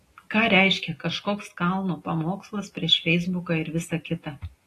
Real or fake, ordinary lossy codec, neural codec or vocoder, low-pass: real; AAC, 48 kbps; none; 14.4 kHz